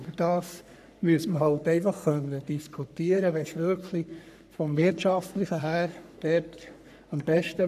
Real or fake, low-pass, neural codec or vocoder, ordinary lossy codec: fake; 14.4 kHz; codec, 44.1 kHz, 3.4 kbps, Pupu-Codec; none